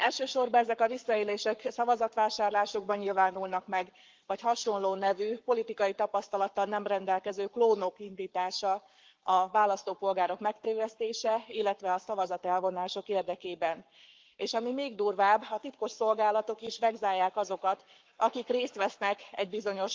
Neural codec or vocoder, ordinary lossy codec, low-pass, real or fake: codec, 44.1 kHz, 7.8 kbps, Pupu-Codec; Opus, 32 kbps; 7.2 kHz; fake